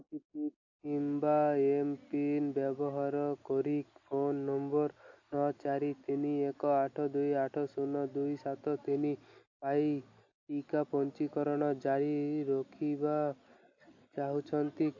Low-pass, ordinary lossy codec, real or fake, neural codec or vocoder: 7.2 kHz; AAC, 48 kbps; real; none